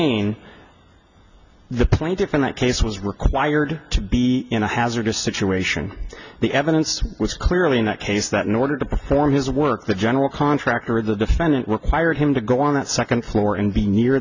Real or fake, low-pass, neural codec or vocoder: real; 7.2 kHz; none